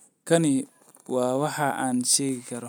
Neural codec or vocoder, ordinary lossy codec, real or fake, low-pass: none; none; real; none